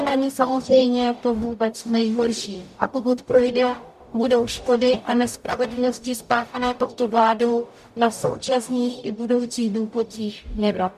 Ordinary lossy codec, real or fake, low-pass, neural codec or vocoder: MP3, 96 kbps; fake; 14.4 kHz; codec, 44.1 kHz, 0.9 kbps, DAC